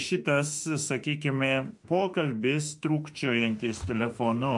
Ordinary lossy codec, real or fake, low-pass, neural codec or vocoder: MP3, 64 kbps; fake; 10.8 kHz; autoencoder, 48 kHz, 32 numbers a frame, DAC-VAE, trained on Japanese speech